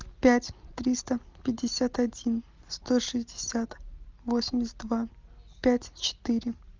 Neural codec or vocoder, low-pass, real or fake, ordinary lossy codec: none; 7.2 kHz; real; Opus, 32 kbps